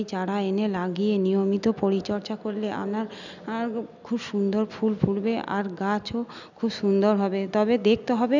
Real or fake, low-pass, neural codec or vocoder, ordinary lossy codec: real; 7.2 kHz; none; none